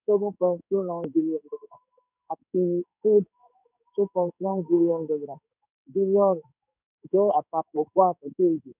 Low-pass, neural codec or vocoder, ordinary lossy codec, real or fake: 3.6 kHz; codec, 16 kHz in and 24 kHz out, 1 kbps, XY-Tokenizer; none; fake